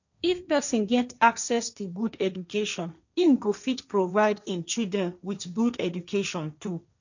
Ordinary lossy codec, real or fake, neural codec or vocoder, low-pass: none; fake; codec, 16 kHz, 1.1 kbps, Voila-Tokenizer; 7.2 kHz